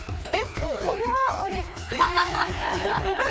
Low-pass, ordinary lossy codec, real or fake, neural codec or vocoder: none; none; fake; codec, 16 kHz, 2 kbps, FreqCodec, larger model